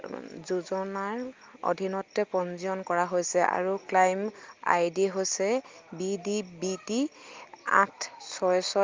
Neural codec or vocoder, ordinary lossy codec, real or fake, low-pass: none; Opus, 32 kbps; real; 7.2 kHz